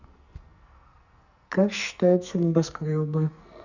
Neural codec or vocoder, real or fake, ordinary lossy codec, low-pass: codec, 44.1 kHz, 2.6 kbps, SNAC; fake; Opus, 64 kbps; 7.2 kHz